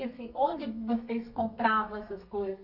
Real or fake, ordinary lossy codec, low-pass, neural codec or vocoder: fake; none; 5.4 kHz; codec, 32 kHz, 1.9 kbps, SNAC